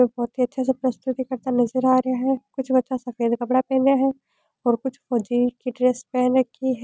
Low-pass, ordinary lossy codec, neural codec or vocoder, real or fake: none; none; none; real